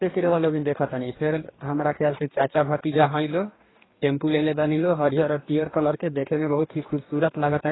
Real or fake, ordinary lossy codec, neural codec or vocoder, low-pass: fake; AAC, 16 kbps; codec, 44.1 kHz, 2.6 kbps, DAC; 7.2 kHz